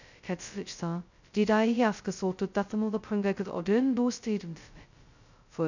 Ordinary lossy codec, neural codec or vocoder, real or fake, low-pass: none; codec, 16 kHz, 0.2 kbps, FocalCodec; fake; 7.2 kHz